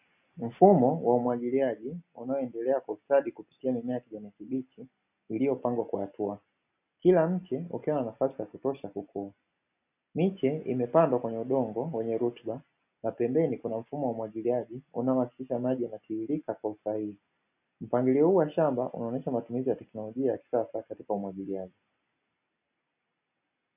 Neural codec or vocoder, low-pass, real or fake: none; 3.6 kHz; real